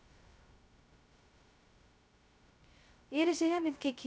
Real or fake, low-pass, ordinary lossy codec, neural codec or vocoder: fake; none; none; codec, 16 kHz, 0.2 kbps, FocalCodec